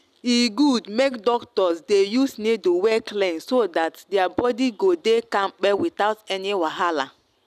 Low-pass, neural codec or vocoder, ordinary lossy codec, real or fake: 14.4 kHz; none; none; real